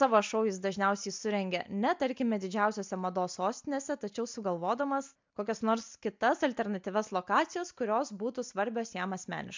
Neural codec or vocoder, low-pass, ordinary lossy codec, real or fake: none; 7.2 kHz; MP3, 64 kbps; real